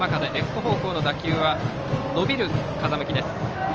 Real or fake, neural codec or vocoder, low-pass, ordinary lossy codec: real; none; 7.2 kHz; Opus, 24 kbps